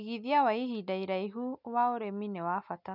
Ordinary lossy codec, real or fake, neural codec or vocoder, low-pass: none; real; none; 5.4 kHz